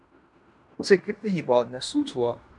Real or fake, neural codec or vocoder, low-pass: fake; codec, 16 kHz in and 24 kHz out, 0.9 kbps, LongCat-Audio-Codec, fine tuned four codebook decoder; 10.8 kHz